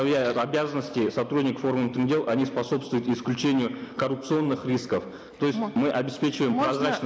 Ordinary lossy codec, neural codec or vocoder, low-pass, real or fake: none; none; none; real